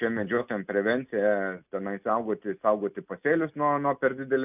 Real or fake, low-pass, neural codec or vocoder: real; 3.6 kHz; none